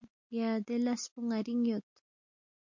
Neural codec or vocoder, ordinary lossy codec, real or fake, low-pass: none; MP3, 48 kbps; real; 7.2 kHz